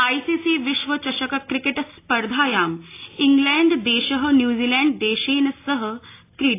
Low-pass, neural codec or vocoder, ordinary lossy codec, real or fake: 3.6 kHz; none; AAC, 24 kbps; real